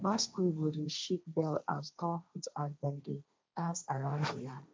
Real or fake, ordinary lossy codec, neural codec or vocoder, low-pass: fake; none; codec, 16 kHz, 1.1 kbps, Voila-Tokenizer; none